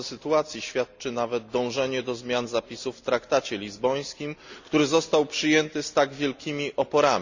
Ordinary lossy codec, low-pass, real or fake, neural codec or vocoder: Opus, 64 kbps; 7.2 kHz; real; none